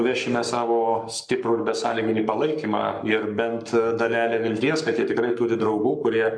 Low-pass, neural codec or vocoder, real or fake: 9.9 kHz; codec, 44.1 kHz, 7.8 kbps, Pupu-Codec; fake